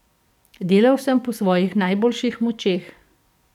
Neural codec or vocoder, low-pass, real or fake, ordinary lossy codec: codec, 44.1 kHz, 7.8 kbps, DAC; 19.8 kHz; fake; none